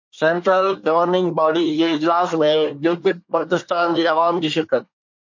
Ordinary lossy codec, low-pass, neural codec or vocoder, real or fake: MP3, 48 kbps; 7.2 kHz; codec, 24 kHz, 1 kbps, SNAC; fake